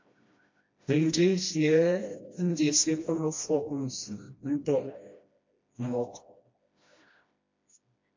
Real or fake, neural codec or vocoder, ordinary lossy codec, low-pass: fake; codec, 16 kHz, 1 kbps, FreqCodec, smaller model; MP3, 48 kbps; 7.2 kHz